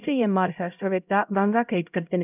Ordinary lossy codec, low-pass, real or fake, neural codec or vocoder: none; 3.6 kHz; fake; codec, 16 kHz, 0.5 kbps, X-Codec, HuBERT features, trained on LibriSpeech